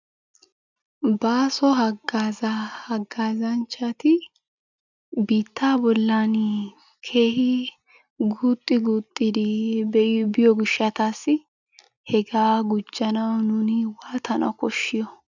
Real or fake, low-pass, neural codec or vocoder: real; 7.2 kHz; none